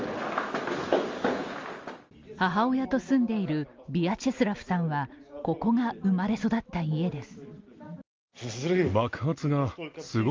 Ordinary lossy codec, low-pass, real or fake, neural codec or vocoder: Opus, 32 kbps; 7.2 kHz; real; none